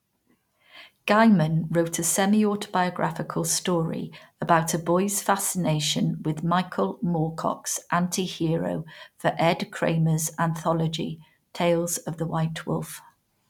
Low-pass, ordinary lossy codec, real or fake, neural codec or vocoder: 19.8 kHz; none; real; none